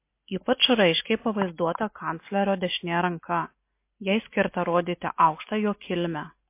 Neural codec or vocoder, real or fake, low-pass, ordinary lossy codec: none; real; 3.6 kHz; MP3, 24 kbps